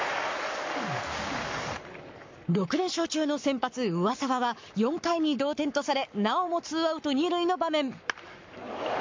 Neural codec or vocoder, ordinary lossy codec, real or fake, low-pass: codec, 44.1 kHz, 7.8 kbps, Pupu-Codec; MP3, 48 kbps; fake; 7.2 kHz